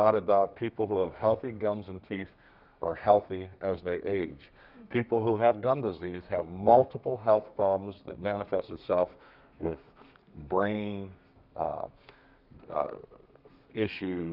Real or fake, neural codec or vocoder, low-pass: fake; codec, 32 kHz, 1.9 kbps, SNAC; 5.4 kHz